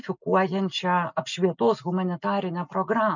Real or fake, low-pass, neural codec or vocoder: real; 7.2 kHz; none